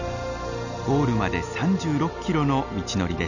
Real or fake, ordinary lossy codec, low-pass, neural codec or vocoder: real; none; 7.2 kHz; none